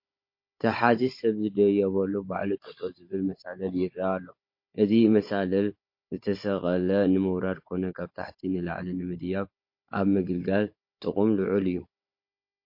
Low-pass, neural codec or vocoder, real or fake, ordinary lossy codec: 5.4 kHz; codec, 16 kHz, 16 kbps, FunCodec, trained on Chinese and English, 50 frames a second; fake; MP3, 32 kbps